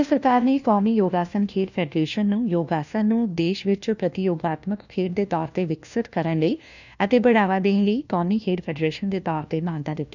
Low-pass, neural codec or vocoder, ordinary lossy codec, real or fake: 7.2 kHz; codec, 16 kHz, 1 kbps, FunCodec, trained on LibriTTS, 50 frames a second; none; fake